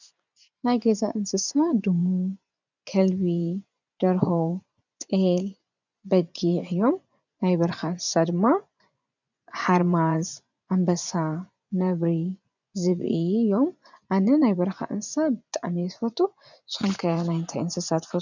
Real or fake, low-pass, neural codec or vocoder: fake; 7.2 kHz; vocoder, 24 kHz, 100 mel bands, Vocos